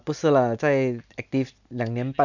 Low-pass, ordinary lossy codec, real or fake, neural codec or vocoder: 7.2 kHz; none; real; none